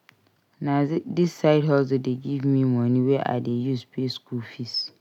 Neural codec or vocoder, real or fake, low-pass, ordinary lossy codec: none; real; 19.8 kHz; none